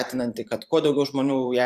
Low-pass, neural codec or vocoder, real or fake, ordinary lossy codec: 14.4 kHz; vocoder, 44.1 kHz, 128 mel bands every 512 samples, BigVGAN v2; fake; AAC, 96 kbps